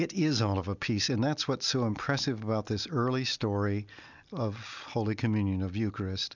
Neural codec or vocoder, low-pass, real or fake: none; 7.2 kHz; real